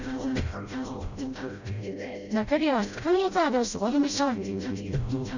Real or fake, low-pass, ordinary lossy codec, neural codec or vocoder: fake; 7.2 kHz; none; codec, 16 kHz, 0.5 kbps, FreqCodec, smaller model